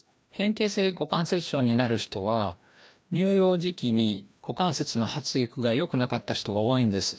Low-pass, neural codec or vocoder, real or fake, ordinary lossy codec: none; codec, 16 kHz, 1 kbps, FreqCodec, larger model; fake; none